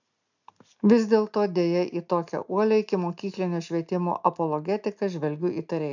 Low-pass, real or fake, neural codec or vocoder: 7.2 kHz; real; none